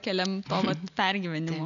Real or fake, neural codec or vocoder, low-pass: real; none; 7.2 kHz